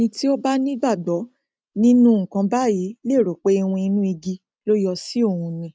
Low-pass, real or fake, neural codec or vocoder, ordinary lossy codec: none; real; none; none